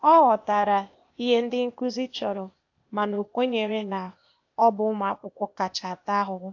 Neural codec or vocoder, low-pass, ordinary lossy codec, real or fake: codec, 16 kHz, 0.8 kbps, ZipCodec; 7.2 kHz; AAC, 48 kbps; fake